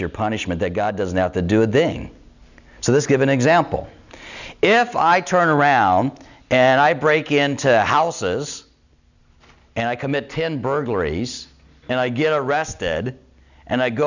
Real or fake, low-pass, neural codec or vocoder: real; 7.2 kHz; none